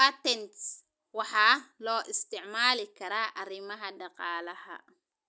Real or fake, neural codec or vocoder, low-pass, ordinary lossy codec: real; none; none; none